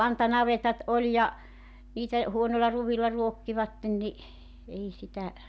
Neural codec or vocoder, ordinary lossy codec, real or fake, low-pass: none; none; real; none